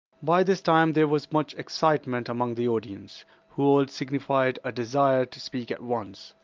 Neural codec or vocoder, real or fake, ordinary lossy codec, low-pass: none; real; Opus, 32 kbps; 7.2 kHz